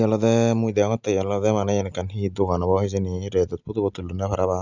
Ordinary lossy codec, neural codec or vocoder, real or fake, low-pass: none; none; real; 7.2 kHz